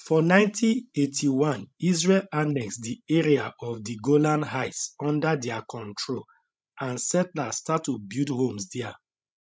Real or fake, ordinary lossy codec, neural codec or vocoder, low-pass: fake; none; codec, 16 kHz, 16 kbps, FreqCodec, larger model; none